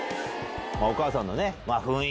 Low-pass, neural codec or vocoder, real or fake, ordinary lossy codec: none; none; real; none